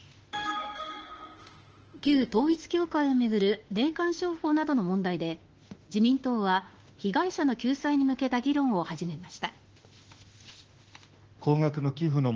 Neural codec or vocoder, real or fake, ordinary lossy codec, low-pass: autoencoder, 48 kHz, 32 numbers a frame, DAC-VAE, trained on Japanese speech; fake; Opus, 16 kbps; 7.2 kHz